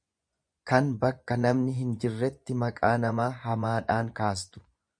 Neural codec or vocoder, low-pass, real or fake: vocoder, 24 kHz, 100 mel bands, Vocos; 9.9 kHz; fake